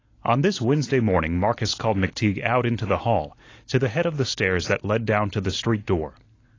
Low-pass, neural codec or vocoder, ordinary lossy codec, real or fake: 7.2 kHz; none; AAC, 32 kbps; real